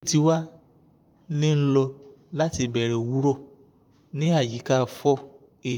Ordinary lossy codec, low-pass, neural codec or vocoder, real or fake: none; 19.8 kHz; vocoder, 44.1 kHz, 128 mel bands, Pupu-Vocoder; fake